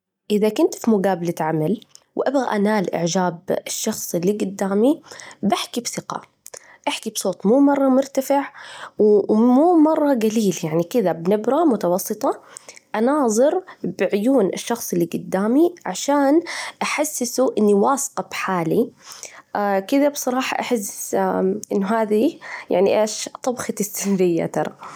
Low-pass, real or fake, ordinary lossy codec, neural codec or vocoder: 19.8 kHz; real; none; none